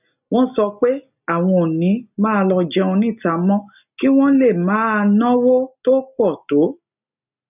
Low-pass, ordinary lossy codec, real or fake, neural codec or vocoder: 3.6 kHz; none; real; none